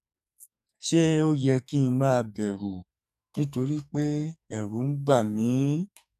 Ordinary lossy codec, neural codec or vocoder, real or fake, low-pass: none; codec, 44.1 kHz, 2.6 kbps, SNAC; fake; 14.4 kHz